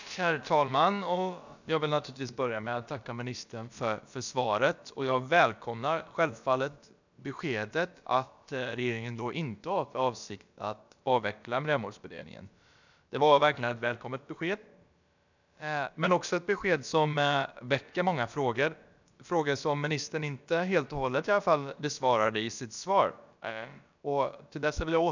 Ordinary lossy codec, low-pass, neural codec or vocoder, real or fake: none; 7.2 kHz; codec, 16 kHz, about 1 kbps, DyCAST, with the encoder's durations; fake